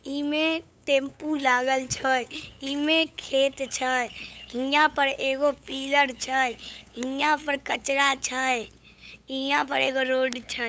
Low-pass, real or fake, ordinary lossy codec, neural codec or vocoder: none; fake; none; codec, 16 kHz, 8 kbps, FunCodec, trained on LibriTTS, 25 frames a second